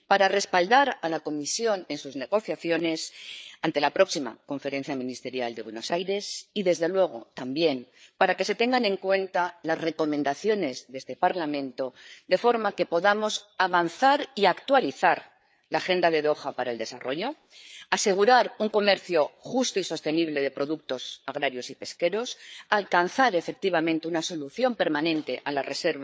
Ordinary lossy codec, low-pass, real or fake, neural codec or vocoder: none; none; fake; codec, 16 kHz, 4 kbps, FreqCodec, larger model